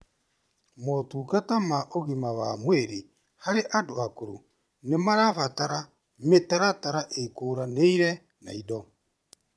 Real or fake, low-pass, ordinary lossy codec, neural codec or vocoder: fake; none; none; vocoder, 22.05 kHz, 80 mel bands, Vocos